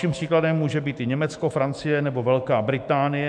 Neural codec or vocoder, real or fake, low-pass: autoencoder, 48 kHz, 128 numbers a frame, DAC-VAE, trained on Japanese speech; fake; 9.9 kHz